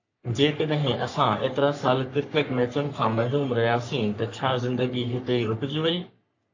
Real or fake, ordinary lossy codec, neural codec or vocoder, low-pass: fake; AAC, 48 kbps; codec, 44.1 kHz, 3.4 kbps, Pupu-Codec; 7.2 kHz